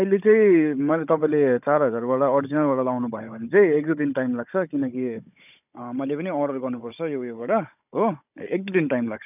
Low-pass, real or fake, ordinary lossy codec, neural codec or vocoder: 3.6 kHz; fake; none; codec, 16 kHz, 16 kbps, FunCodec, trained on Chinese and English, 50 frames a second